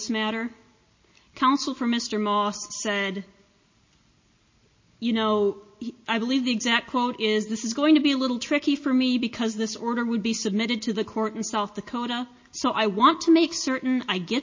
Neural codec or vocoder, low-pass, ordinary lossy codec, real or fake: none; 7.2 kHz; MP3, 32 kbps; real